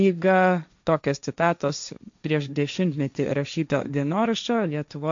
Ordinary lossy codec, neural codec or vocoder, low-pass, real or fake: MP3, 64 kbps; codec, 16 kHz, 1.1 kbps, Voila-Tokenizer; 7.2 kHz; fake